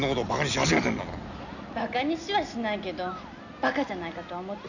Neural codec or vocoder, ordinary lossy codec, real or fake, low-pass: none; none; real; 7.2 kHz